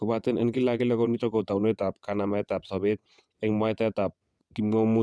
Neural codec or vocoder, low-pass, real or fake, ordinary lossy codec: vocoder, 22.05 kHz, 80 mel bands, WaveNeXt; none; fake; none